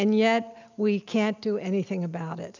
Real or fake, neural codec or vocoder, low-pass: real; none; 7.2 kHz